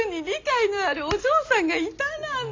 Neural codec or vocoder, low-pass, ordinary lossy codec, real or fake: none; 7.2 kHz; AAC, 48 kbps; real